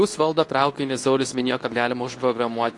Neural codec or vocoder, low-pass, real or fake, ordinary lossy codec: codec, 24 kHz, 0.9 kbps, WavTokenizer, medium speech release version 2; 10.8 kHz; fake; AAC, 48 kbps